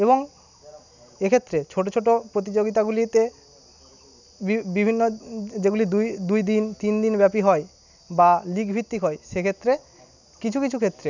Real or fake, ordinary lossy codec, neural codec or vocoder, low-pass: real; none; none; 7.2 kHz